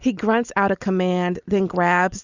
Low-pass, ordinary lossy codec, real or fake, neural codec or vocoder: 7.2 kHz; Opus, 64 kbps; real; none